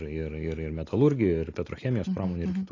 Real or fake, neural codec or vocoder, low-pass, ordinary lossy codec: real; none; 7.2 kHz; AAC, 32 kbps